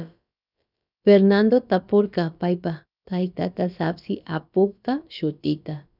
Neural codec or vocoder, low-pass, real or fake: codec, 16 kHz, about 1 kbps, DyCAST, with the encoder's durations; 5.4 kHz; fake